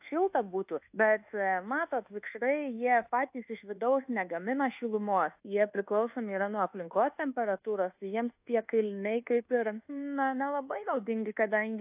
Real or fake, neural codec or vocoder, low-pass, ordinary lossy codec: fake; codec, 24 kHz, 1.2 kbps, DualCodec; 3.6 kHz; AAC, 32 kbps